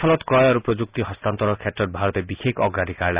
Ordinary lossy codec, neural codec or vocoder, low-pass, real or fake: Opus, 64 kbps; none; 3.6 kHz; real